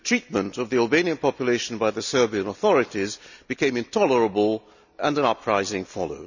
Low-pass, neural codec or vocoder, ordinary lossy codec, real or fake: 7.2 kHz; none; none; real